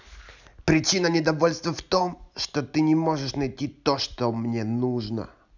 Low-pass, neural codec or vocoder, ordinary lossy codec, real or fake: 7.2 kHz; none; none; real